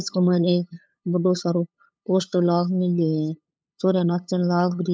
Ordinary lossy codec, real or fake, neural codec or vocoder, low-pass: none; fake; codec, 16 kHz, 8 kbps, FunCodec, trained on LibriTTS, 25 frames a second; none